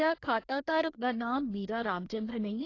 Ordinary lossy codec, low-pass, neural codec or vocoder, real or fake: AAC, 32 kbps; 7.2 kHz; codec, 24 kHz, 1 kbps, SNAC; fake